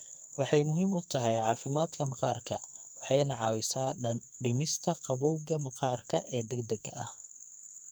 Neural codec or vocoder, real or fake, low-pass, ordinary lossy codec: codec, 44.1 kHz, 2.6 kbps, SNAC; fake; none; none